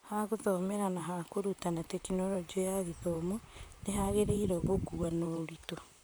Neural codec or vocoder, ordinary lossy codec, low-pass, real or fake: vocoder, 44.1 kHz, 128 mel bands, Pupu-Vocoder; none; none; fake